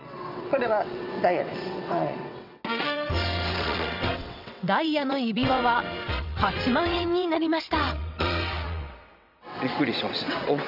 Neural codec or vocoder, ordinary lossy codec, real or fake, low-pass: vocoder, 22.05 kHz, 80 mel bands, WaveNeXt; none; fake; 5.4 kHz